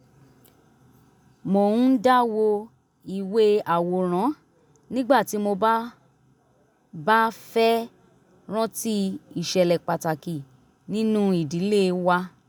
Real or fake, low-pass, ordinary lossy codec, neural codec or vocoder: real; none; none; none